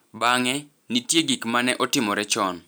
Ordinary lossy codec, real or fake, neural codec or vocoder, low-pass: none; real; none; none